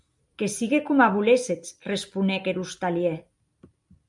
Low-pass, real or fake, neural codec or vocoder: 10.8 kHz; real; none